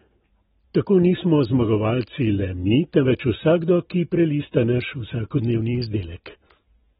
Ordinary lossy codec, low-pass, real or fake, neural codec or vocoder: AAC, 16 kbps; 10.8 kHz; real; none